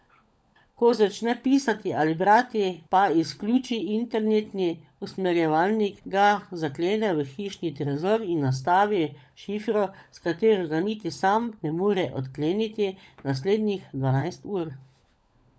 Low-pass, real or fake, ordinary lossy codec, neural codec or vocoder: none; fake; none; codec, 16 kHz, 16 kbps, FunCodec, trained on LibriTTS, 50 frames a second